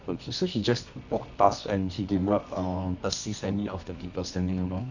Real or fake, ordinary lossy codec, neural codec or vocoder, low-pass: fake; none; codec, 24 kHz, 0.9 kbps, WavTokenizer, medium music audio release; 7.2 kHz